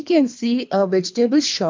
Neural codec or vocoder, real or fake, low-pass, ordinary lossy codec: codec, 24 kHz, 3 kbps, HILCodec; fake; 7.2 kHz; MP3, 64 kbps